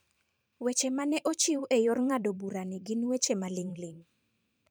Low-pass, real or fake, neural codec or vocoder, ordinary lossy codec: none; fake; vocoder, 44.1 kHz, 128 mel bands every 512 samples, BigVGAN v2; none